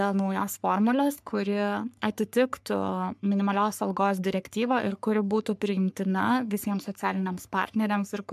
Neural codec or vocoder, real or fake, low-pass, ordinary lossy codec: codec, 44.1 kHz, 3.4 kbps, Pupu-Codec; fake; 14.4 kHz; MP3, 96 kbps